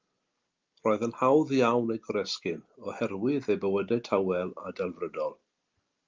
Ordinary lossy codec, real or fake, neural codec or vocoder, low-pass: Opus, 24 kbps; real; none; 7.2 kHz